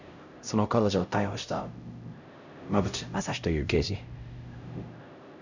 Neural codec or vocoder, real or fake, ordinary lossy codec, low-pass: codec, 16 kHz, 0.5 kbps, X-Codec, HuBERT features, trained on LibriSpeech; fake; none; 7.2 kHz